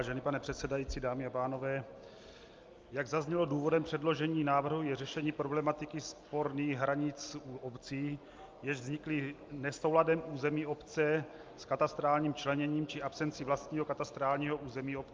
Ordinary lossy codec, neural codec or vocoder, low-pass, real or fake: Opus, 24 kbps; none; 7.2 kHz; real